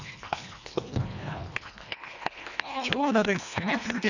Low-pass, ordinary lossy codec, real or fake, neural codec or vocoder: 7.2 kHz; none; fake; codec, 24 kHz, 1.5 kbps, HILCodec